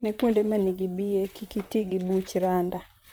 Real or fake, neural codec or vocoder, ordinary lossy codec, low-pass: fake; vocoder, 44.1 kHz, 128 mel bands, Pupu-Vocoder; none; none